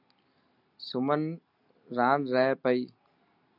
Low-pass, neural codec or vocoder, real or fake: 5.4 kHz; none; real